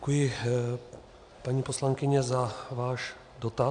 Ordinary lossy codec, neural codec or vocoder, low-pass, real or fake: AAC, 64 kbps; none; 9.9 kHz; real